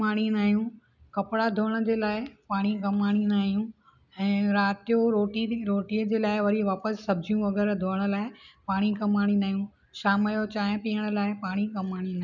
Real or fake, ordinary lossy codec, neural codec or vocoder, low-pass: real; none; none; 7.2 kHz